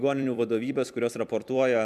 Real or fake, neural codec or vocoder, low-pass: fake; vocoder, 44.1 kHz, 128 mel bands every 256 samples, BigVGAN v2; 14.4 kHz